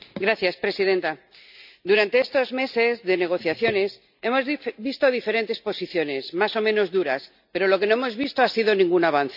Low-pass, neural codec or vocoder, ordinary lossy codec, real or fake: 5.4 kHz; none; none; real